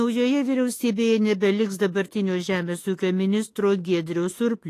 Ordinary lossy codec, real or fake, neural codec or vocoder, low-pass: AAC, 48 kbps; fake; autoencoder, 48 kHz, 32 numbers a frame, DAC-VAE, trained on Japanese speech; 14.4 kHz